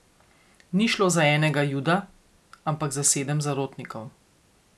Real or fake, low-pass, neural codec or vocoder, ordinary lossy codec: real; none; none; none